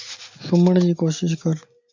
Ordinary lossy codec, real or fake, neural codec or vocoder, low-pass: MP3, 48 kbps; real; none; 7.2 kHz